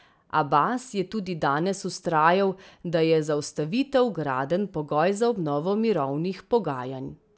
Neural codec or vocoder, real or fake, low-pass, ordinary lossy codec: none; real; none; none